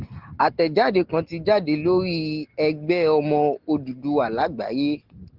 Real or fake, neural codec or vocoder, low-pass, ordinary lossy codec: real; none; 5.4 kHz; Opus, 16 kbps